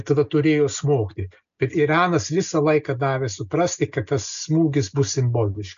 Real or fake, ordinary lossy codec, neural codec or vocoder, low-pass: real; MP3, 96 kbps; none; 7.2 kHz